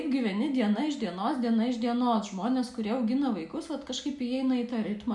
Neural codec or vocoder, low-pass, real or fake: none; 10.8 kHz; real